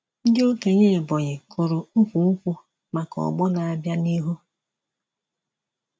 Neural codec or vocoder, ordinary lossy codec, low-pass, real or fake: none; none; none; real